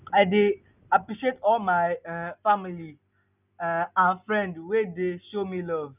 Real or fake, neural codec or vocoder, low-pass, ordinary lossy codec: real; none; 3.6 kHz; none